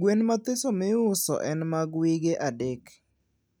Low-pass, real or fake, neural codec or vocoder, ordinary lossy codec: none; real; none; none